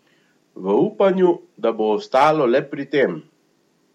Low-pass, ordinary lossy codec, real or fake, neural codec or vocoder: 19.8 kHz; MP3, 64 kbps; real; none